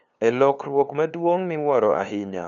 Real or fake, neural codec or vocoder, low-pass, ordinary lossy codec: fake; codec, 16 kHz, 2 kbps, FunCodec, trained on LibriTTS, 25 frames a second; 7.2 kHz; none